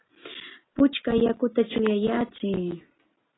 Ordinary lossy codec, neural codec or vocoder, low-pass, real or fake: AAC, 16 kbps; none; 7.2 kHz; real